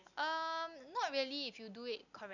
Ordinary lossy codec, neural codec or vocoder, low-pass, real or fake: none; none; 7.2 kHz; real